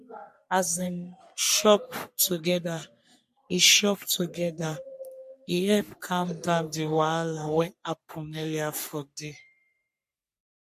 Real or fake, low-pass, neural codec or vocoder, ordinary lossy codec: fake; 14.4 kHz; codec, 44.1 kHz, 3.4 kbps, Pupu-Codec; MP3, 64 kbps